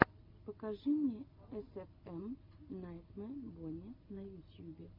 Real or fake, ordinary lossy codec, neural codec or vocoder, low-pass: real; MP3, 48 kbps; none; 5.4 kHz